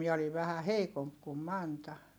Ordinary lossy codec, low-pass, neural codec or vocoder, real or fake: none; none; none; real